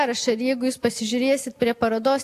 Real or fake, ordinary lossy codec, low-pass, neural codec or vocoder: real; AAC, 64 kbps; 14.4 kHz; none